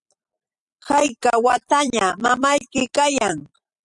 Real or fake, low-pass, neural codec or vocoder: fake; 10.8 kHz; vocoder, 44.1 kHz, 128 mel bands every 256 samples, BigVGAN v2